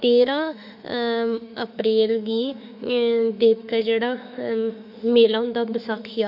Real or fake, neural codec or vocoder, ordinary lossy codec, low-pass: fake; autoencoder, 48 kHz, 32 numbers a frame, DAC-VAE, trained on Japanese speech; none; 5.4 kHz